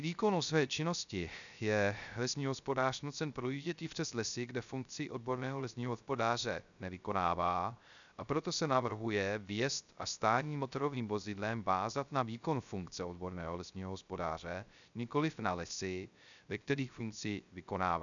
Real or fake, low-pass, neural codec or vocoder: fake; 7.2 kHz; codec, 16 kHz, 0.3 kbps, FocalCodec